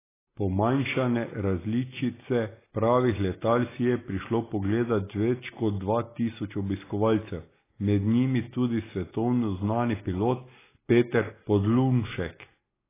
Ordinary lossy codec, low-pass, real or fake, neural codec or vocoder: AAC, 16 kbps; 3.6 kHz; real; none